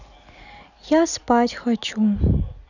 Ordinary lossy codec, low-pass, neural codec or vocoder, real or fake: none; 7.2 kHz; none; real